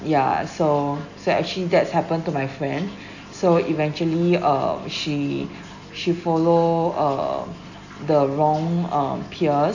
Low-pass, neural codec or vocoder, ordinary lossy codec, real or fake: 7.2 kHz; none; none; real